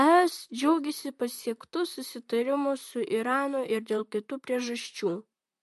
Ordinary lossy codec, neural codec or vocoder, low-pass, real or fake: MP3, 64 kbps; vocoder, 44.1 kHz, 128 mel bands, Pupu-Vocoder; 14.4 kHz; fake